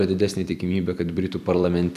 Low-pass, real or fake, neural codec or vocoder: 14.4 kHz; fake; vocoder, 44.1 kHz, 128 mel bands every 256 samples, BigVGAN v2